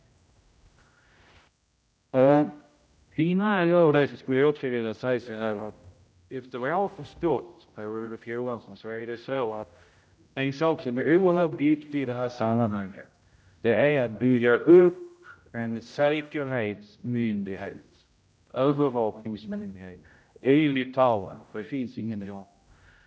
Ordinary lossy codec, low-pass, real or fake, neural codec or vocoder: none; none; fake; codec, 16 kHz, 0.5 kbps, X-Codec, HuBERT features, trained on general audio